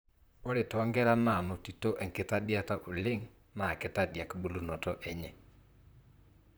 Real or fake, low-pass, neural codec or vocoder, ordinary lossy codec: fake; none; vocoder, 44.1 kHz, 128 mel bands, Pupu-Vocoder; none